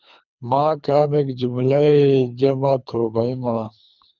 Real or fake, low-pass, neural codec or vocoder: fake; 7.2 kHz; codec, 24 kHz, 3 kbps, HILCodec